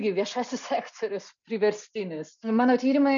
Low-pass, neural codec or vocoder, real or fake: 7.2 kHz; none; real